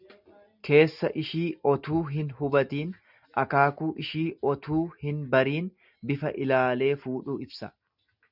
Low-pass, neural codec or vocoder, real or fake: 5.4 kHz; none; real